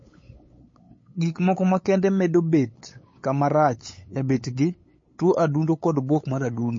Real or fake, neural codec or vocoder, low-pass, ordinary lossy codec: fake; codec, 16 kHz, 8 kbps, FunCodec, trained on LibriTTS, 25 frames a second; 7.2 kHz; MP3, 32 kbps